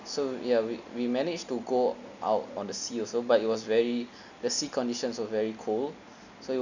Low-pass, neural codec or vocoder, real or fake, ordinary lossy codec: 7.2 kHz; none; real; none